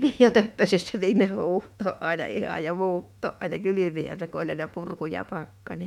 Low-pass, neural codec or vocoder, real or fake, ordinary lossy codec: 19.8 kHz; autoencoder, 48 kHz, 32 numbers a frame, DAC-VAE, trained on Japanese speech; fake; MP3, 96 kbps